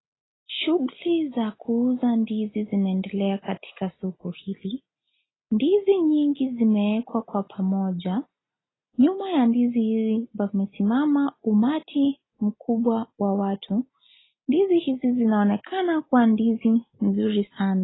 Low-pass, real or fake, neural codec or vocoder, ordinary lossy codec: 7.2 kHz; real; none; AAC, 16 kbps